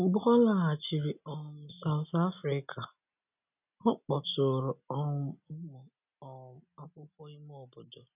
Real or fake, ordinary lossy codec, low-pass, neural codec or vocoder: real; none; 3.6 kHz; none